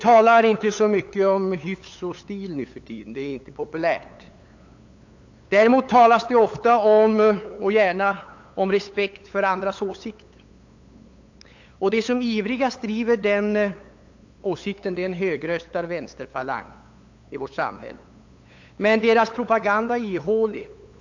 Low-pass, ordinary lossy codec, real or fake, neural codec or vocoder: 7.2 kHz; AAC, 48 kbps; fake; codec, 16 kHz, 8 kbps, FunCodec, trained on LibriTTS, 25 frames a second